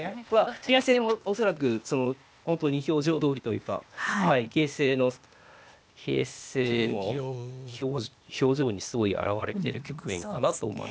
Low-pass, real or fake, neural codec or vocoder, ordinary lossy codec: none; fake; codec, 16 kHz, 0.8 kbps, ZipCodec; none